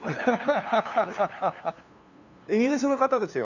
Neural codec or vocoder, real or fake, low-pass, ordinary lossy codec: codec, 16 kHz, 2 kbps, FunCodec, trained on LibriTTS, 25 frames a second; fake; 7.2 kHz; none